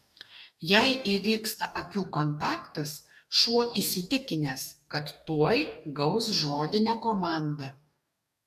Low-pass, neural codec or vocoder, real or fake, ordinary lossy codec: 14.4 kHz; codec, 44.1 kHz, 2.6 kbps, DAC; fake; AAC, 96 kbps